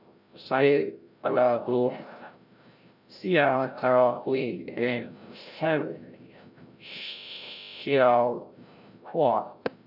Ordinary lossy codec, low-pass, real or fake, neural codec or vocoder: none; 5.4 kHz; fake; codec, 16 kHz, 0.5 kbps, FreqCodec, larger model